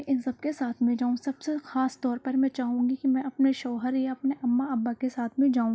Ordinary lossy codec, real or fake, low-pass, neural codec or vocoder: none; real; none; none